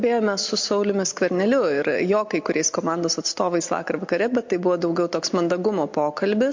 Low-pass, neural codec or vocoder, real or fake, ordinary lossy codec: 7.2 kHz; none; real; MP3, 48 kbps